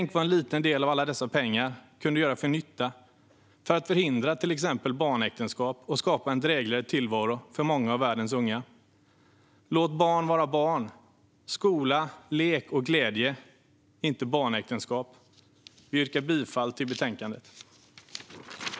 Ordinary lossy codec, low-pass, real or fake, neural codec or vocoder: none; none; real; none